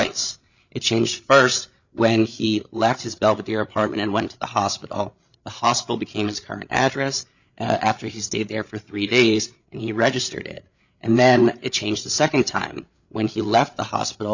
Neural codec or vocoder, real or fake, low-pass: codec, 16 kHz, 8 kbps, FreqCodec, larger model; fake; 7.2 kHz